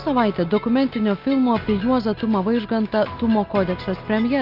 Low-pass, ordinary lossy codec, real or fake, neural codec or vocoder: 5.4 kHz; Opus, 24 kbps; real; none